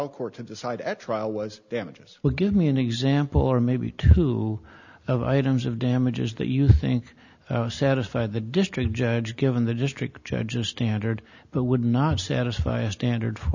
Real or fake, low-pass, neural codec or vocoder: real; 7.2 kHz; none